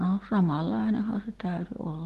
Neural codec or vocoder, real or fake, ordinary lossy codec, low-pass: none; real; Opus, 16 kbps; 14.4 kHz